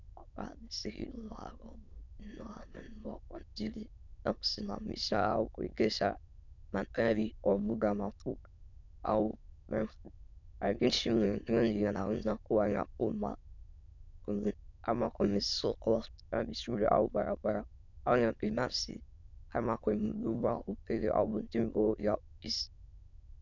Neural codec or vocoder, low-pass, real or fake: autoencoder, 22.05 kHz, a latent of 192 numbers a frame, VITS, trained on many speakers; 7.2 kHz; fake